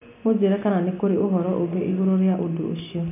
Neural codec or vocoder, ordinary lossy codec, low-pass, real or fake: none; none; 3.6 kHz; real